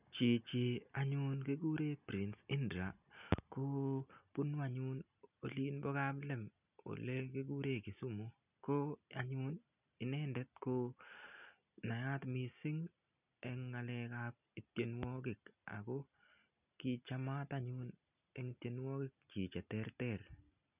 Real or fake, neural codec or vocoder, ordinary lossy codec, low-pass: real; none; none; 3.6 kHz